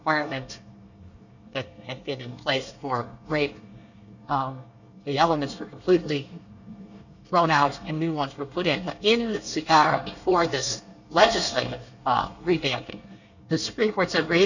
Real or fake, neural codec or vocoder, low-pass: fake; codec, 24 kHz, 1 kbps, SNAC; 7.2 kHz